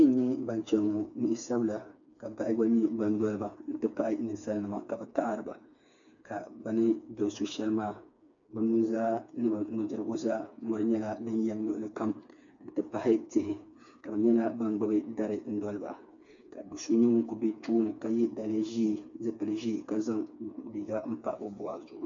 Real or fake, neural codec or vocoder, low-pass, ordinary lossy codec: fake; codec, 16 kHz, 4 kbps, FreqCodec, smaller model; 7.2 kHz; MP3, 64 kbps